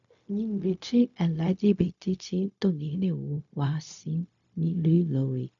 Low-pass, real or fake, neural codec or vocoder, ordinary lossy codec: 7.2 kHz; fake; codec, 16 kHz, 0.4 kbps, LongCat-Audio-Codec; none